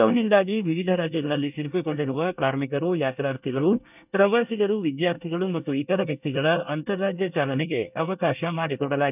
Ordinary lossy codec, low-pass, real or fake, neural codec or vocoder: none; 3.6 kHz; fake; codec, 24 kHz, 1 kbps, SNAC